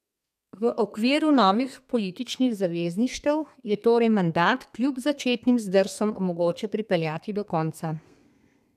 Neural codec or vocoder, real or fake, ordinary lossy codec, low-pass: codec, 32 kHz, 1.9 kbps, SNAC; fake; none; 14.4 kHz